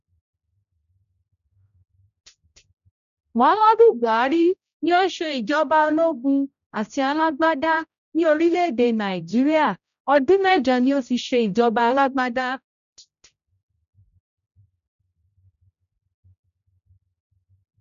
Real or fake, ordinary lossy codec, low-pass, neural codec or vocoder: fake; none; 7.2 kHz; codec, 16 kHz, 0.5 kbps, X-Codec, HuBERT features, trained on general audio